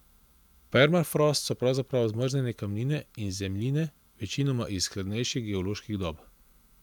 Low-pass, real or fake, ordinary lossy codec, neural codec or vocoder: 19.8 kHz; fake; none; vocoder, 44.1 kHz, 128 mel bands every 512 samples, BigVGAN v2